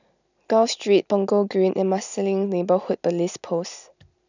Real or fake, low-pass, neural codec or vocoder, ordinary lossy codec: real; 7.2 kHz; none; none